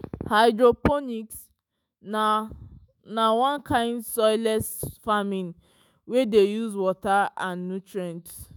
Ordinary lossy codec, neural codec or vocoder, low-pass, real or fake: none; autoencoder, 48 kHz, 128 numbers a frame, DAC-VAE, trained on Japanese speech; none; fake